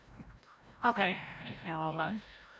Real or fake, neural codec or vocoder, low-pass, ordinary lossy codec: fake; codec, 16 kHz, 0.5 kbps, FreqCodec, larger model; none; none